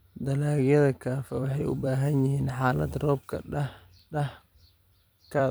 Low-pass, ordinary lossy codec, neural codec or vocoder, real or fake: none; none; vocoder, 44.1 kHz, 128 mel bands every 256 samples, BigVGAN v2; fake